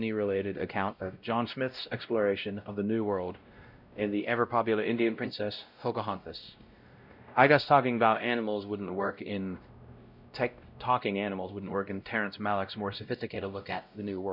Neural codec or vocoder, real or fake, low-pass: codec, 16 kHz, 0.5 kbps, X-Codec, WavLM features, trained on Multilingual LibriSpeech; fake; 5.4 kHz